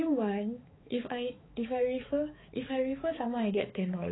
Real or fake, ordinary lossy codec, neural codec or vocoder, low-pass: fake; AAC, 16 kbps; codec, 16 kHz, 4 kbps, X-Codec, HuBERT features, trained on balanced general audio; 7.2 kHz